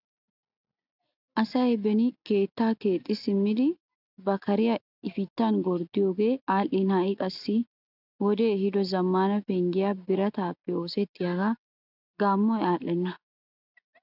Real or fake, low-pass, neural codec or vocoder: real; 5.4 kHz; none